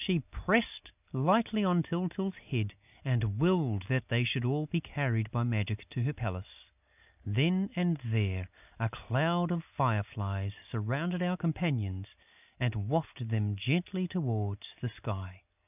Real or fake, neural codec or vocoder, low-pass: real; none; 3.6 kHz